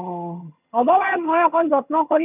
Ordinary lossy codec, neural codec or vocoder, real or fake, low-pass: none; vocoder, 22.05 kHz, 80 mel bands, HiFi-GAN; fake; 3.6 kHz